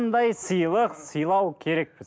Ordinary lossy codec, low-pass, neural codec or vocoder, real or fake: none; none; none; real